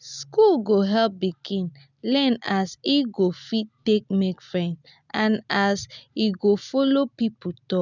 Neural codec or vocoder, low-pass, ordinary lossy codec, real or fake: none; 7.2 kHz; none; real